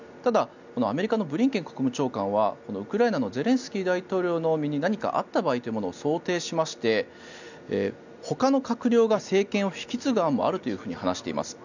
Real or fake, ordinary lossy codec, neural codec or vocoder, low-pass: real; none; none; 7.2 kHz